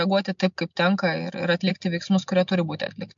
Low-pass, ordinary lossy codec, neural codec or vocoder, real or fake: 7.2 kHz; MP3, 64 kbps; none; real